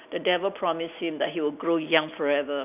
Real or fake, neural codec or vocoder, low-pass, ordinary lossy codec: real; none; 3.6 kHz; none